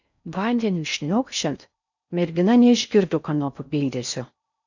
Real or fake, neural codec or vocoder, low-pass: fake; codec, 16 kHz in and 24 kHz out, 0.6 kbps, FocalCodec, streaming, 4096 codes; 7.2 kHz